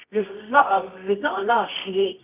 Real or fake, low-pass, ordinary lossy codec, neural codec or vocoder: fake; 3.6 kHz; none; codec, 24 kHz, 0.9 kbps, WavTokenizer, medium music audio release